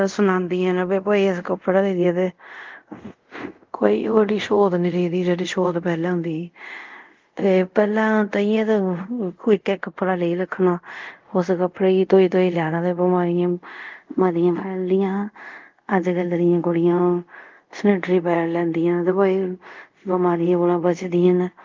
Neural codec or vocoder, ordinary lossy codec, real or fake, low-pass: codec, 24 kHz, 0.5 kbps, DualCodec; Opus, 32 kbps; fake; 7.2 kHz